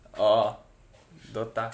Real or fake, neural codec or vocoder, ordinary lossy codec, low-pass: real; none; none; none